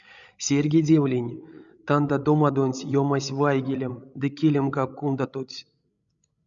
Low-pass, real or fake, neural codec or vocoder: 7.2 kHz; fake; codec, 16 kHz, 16 kbps, FreqCodec, larger model